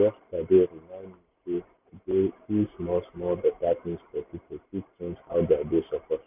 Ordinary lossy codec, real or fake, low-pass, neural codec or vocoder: none; real; 3.6 kHz; none